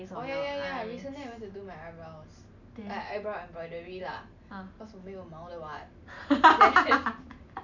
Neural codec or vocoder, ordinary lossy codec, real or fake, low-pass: none; none; real; 7.2 kHz